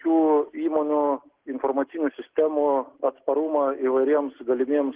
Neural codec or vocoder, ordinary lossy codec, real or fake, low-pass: none; Opus, 16 kbps; real; 3.6 kHz